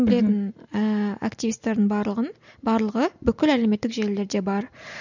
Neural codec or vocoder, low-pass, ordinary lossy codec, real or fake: none; 7.2 kHz; none; real